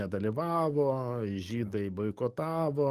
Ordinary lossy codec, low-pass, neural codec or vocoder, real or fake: Opus, 32 kbps; 14.4 kHz; codec, 44.1 kHz, 7.8 kbps, DAC; fake